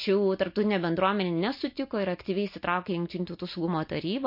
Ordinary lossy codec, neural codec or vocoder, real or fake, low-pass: MP3, 32 kbps; none; real; 5.4 kHz